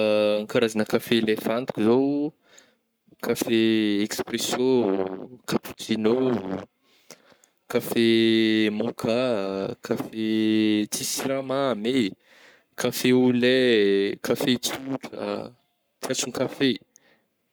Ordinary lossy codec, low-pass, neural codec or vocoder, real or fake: none; none; codec, 44.1 kHz, 7.8 kbps, Pupu-Codec; fake